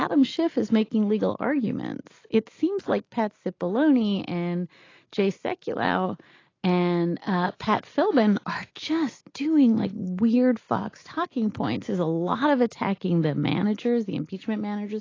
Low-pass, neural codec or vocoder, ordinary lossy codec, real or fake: 7.2 kHz; none; AAC, 32 kbps; real